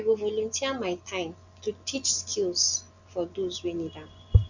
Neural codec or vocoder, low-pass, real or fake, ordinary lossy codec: none; 7.2 kHz; real; none